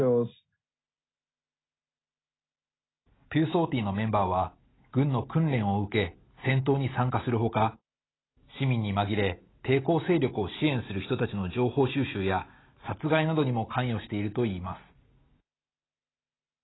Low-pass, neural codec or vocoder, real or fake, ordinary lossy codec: 7.2 kHz; none; real; AAC, 16 kbps